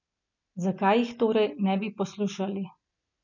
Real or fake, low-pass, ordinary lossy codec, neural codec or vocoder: real; 7.2 kHz; none; none